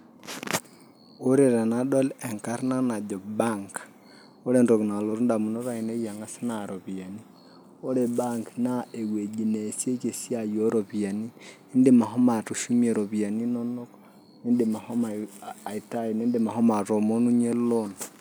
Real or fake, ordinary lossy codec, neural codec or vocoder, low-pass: real; none; none; none